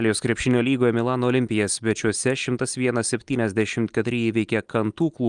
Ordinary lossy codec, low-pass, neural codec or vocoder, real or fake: Opus, 32 kbps; 9.9 kHz; none; real